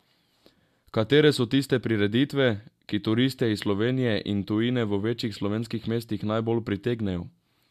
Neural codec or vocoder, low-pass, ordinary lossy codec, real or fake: none; 14.4 kHz; MP3, 96 kbps; real